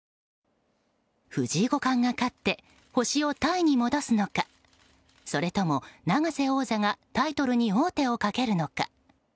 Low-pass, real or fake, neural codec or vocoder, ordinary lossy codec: none; real; none; none